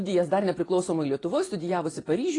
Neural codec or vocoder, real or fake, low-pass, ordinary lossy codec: vocoder, 24 kHz, 100 mel bands, Vocos; fake; 10.8 kHz; AAC, 32 kbps